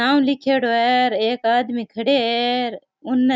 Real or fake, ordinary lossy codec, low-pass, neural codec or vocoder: real; none; none; none